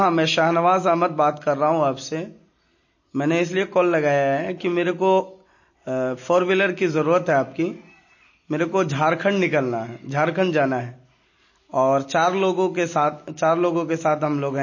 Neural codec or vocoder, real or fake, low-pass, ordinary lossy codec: none; real; 7.2 kHz; MP3, 32 kbps